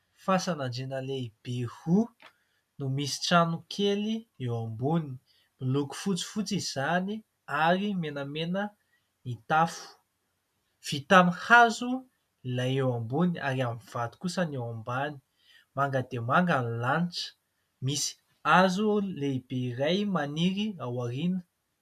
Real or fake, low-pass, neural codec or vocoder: real; 14.4 kHz; none